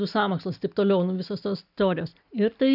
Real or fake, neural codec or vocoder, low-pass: real; none; 5.4 kHz